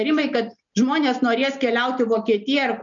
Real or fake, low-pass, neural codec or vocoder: real; 7.2 kHz; none